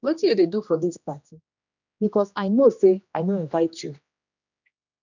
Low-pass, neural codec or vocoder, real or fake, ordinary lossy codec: 7.2 kHz; codec, 16 kHz, 1 kbps, X-Codec, HuBERT features, trained on general audio; fake; none